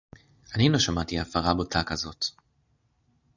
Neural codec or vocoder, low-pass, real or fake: none; 7.2 kHz; real